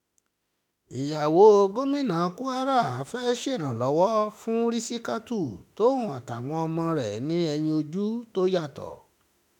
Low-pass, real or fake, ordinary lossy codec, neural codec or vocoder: 19.8 kHz; fake; none; autoencoder, 48 kHz, 32 numbers a frame, DAC-VAE, trained on Japanese speech